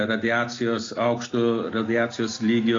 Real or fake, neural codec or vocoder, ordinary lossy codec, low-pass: real; none; AAC, 32 kbps; 7.2 kHz